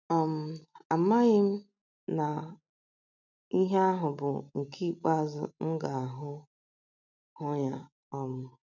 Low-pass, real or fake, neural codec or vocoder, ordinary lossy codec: 7.2 kHz; real; none; none